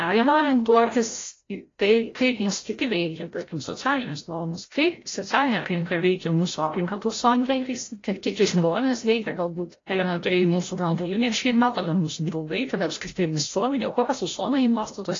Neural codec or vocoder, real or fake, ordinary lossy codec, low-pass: codec, 16 kHz, 0.5 kbps, FreqCodec, larger model; fake; AAC, 32 kbps; 7.2 kHz